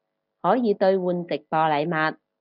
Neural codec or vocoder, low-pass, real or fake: none; 5.4 kHz; real